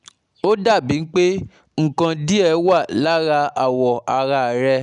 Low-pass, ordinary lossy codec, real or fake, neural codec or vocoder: 9.9 kHz; none; real; none